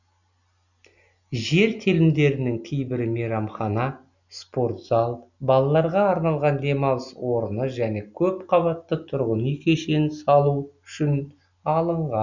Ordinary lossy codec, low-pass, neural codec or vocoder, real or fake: none; 7.2 kHz; none; real